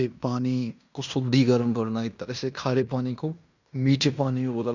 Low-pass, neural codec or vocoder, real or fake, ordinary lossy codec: 7.2 kHz; codec, 16 kHz in and 24 kHz out, 0.9 kbps, LongCat-Audio-Codec, fine tuned four codebook decoder; fake; none